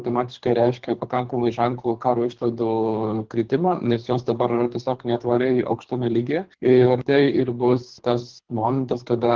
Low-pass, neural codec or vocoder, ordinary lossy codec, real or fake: 7.2 kHz; codec, 24 kHz, 3 kbps, HILCodec; Opus, 16 kbps; fake